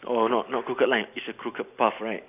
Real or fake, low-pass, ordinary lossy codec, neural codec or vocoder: fake; 3.6 kHz; none; vocoder, 44.1 kHz, 128 mel bands every 256 samples, BigVGAN v2